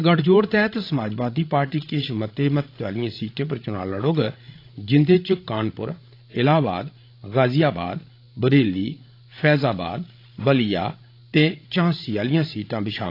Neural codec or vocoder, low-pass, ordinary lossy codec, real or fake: codec, 16 kHz, 16 kbps, FreqCodec, larger model; 5.4 kHz; AAC, 32 kbps; fake